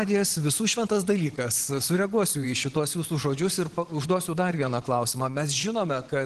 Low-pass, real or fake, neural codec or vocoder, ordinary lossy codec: 10.8 kHz; fake; vocoder, 24 kHz, 100 mel bands, Vocos; Opus, 16 kbps